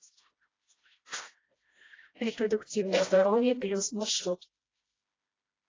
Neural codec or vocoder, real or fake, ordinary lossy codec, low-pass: codec, 16 kHz, 1 kbps, FreqCodec, smaller model; fake; AAC, 32 kbps; 7.2 kHz